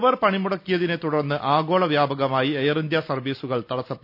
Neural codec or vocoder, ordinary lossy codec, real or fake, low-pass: none; none; real; 5.4 kHz